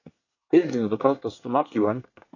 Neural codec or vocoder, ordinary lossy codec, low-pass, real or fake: codec, 24 kHz, 1 kbps, SNAC; AAC, 48 kbps; 7.2 kHz; fake